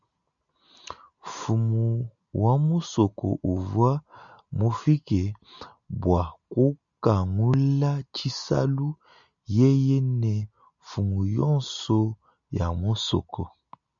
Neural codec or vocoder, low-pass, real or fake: none; 7.2 kHz; real